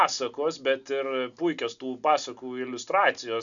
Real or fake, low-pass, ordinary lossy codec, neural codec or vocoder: real; 7.2 kHz; MP3, 96 kbps; none